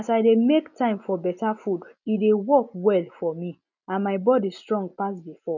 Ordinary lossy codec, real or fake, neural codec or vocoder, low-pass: none; real; none; 7.2 kHz